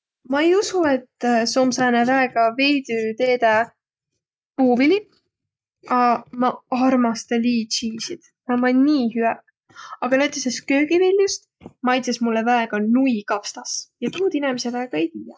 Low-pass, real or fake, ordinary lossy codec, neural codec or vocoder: none; real; none; none